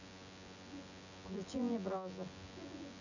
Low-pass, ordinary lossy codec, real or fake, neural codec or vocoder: 7.2 kHz; none; fake; vocoder, 24 kHz, 100 mel bands, Vocos